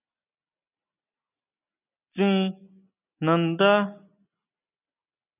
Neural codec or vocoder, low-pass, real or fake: none; 3.6 kHz; real